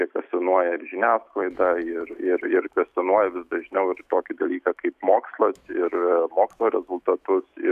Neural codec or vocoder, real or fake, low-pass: autoencoder, 48 kHz, 128 numbers a frame, DAC-VAE, trained on Japanese speech; fake; 14.4 kHz